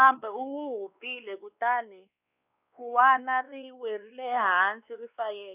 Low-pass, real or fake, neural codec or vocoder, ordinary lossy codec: 3.6 kHz; fake; codec, 16 kHz, 2 kbps, X-Codec, WavLM features, trained on Multilingual LibriSpeech; none